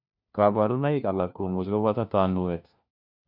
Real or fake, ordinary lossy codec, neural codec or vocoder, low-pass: fake; none; codec, 16 kHz, 1 kbps, FunCodec, trained on LibriTTS, 50 frames a second; 5.4 kHz